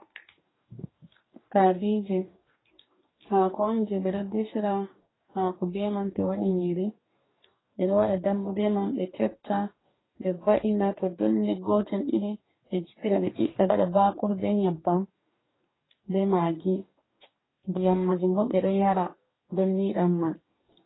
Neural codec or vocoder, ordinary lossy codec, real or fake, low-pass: codec, 44.1 kHz, 2.6 kbps, DAC; AAC, 16 kbps; fake; 7.2 kHz